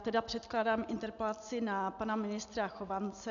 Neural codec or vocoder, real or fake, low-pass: none; real; 7.2 kHz